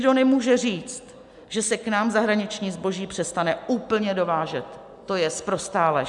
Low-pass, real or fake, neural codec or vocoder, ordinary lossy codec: 10.8 kHz; real; none; MP3, 96 kbps